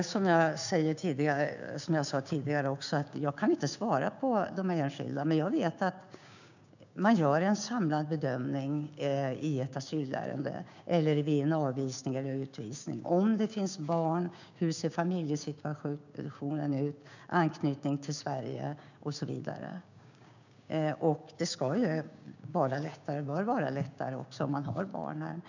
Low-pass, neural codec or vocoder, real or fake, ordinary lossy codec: 7.2 kHz; codec, 16 kHz, 6 kbps, DAC; fake; none